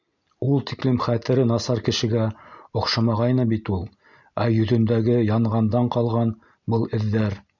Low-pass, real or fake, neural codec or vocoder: 7.2 kHz; real; none